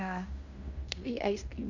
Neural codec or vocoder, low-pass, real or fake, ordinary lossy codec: codec, 16 kHz in and 24 kHz out, 0.9 kbps, LongCat-Audio-Codec, fine tuned four codebook decoder; 7.2 kHz; fake; none